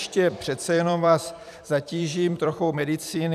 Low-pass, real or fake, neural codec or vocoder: 14.4 kHz; fake; vocoder, 44.1 kHz, 128 mel bands every 256 samples, BigVGAN v2